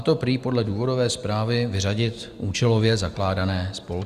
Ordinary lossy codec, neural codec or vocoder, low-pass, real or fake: Opus, 64 kbps; none; 14.4 kHz; real